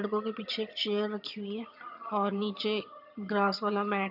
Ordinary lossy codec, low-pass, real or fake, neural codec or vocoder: AAC, 48 kbps; 5.4 kHz; fake; vocoder, 22.05 kHz, 80 mel bands, HiFi-GAN